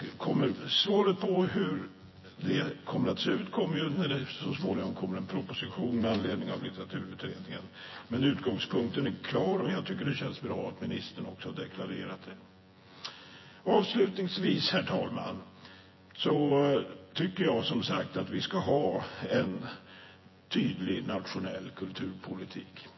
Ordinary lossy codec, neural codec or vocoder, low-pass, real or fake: MP3, 24 kbps; vocoder, 24 kHz, 100 mel bands, Vocos; 7.2 kHz; fake